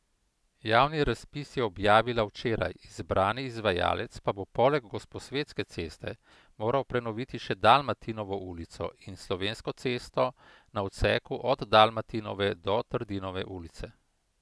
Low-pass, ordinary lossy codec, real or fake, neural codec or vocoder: none; none; real; none